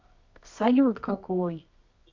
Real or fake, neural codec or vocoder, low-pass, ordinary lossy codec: fake; codec, 24 kHz, 0.9 kbps, WavTokenizer, medium music audio release; 7.2 kHz; MP3, 64 kbps